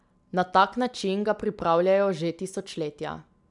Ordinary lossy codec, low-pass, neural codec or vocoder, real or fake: none; 10.8 kHz; none; real